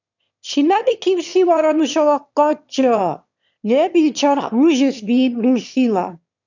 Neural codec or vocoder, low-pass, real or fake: autoencoder, 22.05 kHz, a latent of 192 numbers a frame, VITS, trained on one speaker; 7.2 kHz; fake